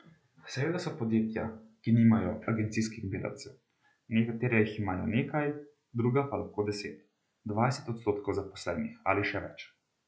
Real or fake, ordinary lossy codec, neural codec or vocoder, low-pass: real; none; none; none